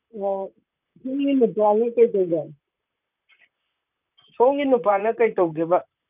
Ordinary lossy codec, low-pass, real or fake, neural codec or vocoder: none; 3.6 kHz; fake; vocoder, 44.1 kHz, 128 mel bands, Pupu-Vocoder